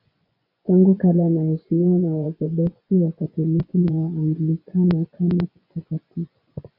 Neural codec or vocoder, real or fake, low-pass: vocoder, 24 kHz, 100 mel bands, Vocos; fake; 5.4 kHz